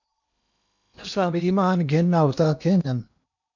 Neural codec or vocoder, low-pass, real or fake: codec, 16 kHz in and 24 kHz out, 0.8 kbps, FocalCodec, streaming, 65536 codes; 7.2 kHz; fake